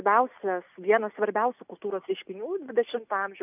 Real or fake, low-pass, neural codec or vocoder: real; 3.6 kHz; none